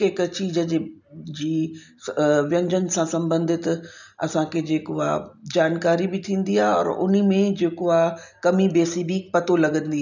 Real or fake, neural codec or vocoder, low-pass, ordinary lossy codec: real; none; 7.2 kHz; none